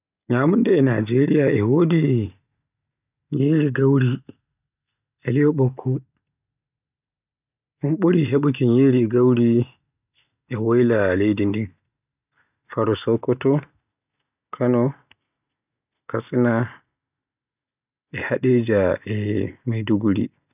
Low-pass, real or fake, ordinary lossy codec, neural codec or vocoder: 3.6 kHz; real; none; none